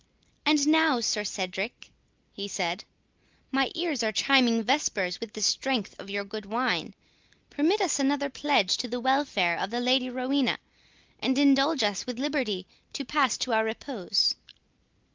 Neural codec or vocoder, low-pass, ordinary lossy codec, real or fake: none; 7.2 kHz; Opus, 24 kbps; real